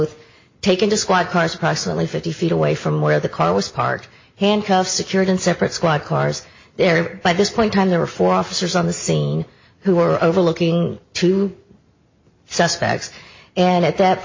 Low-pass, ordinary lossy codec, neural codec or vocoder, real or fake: 7.2 kHz; MP3, 32 kbps; none; real